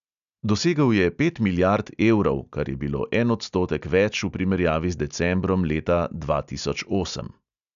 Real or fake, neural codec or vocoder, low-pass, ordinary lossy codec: real; none; 7.2 kHz; none